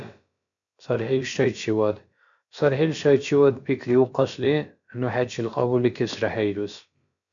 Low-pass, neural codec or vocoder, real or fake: 7.2 kHz; codec, 16 kHz, about 1 kbps, DyCAST, with the encoder's durations; fake